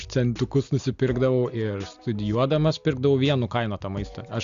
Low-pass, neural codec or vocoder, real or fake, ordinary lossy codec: 7.2 kHz; none; real; Opus, 64 kbps